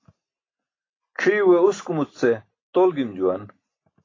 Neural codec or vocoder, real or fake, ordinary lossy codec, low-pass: none; real; AAC, 32 kbps; 7.2 kHz